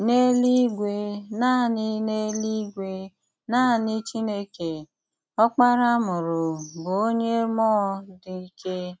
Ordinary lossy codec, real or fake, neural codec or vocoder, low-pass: none; real; none; none